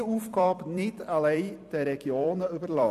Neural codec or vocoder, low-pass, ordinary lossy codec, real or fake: vocoder, 44.1 kHz, 128 mel bands every 256 samples, BigVGAN v2; 14.4 kHz; none; fake